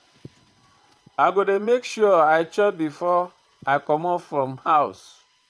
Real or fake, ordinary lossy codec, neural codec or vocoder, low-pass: fake; none; vocoder, 22.05 kHz, 80 mel bands, WaveNeXt; none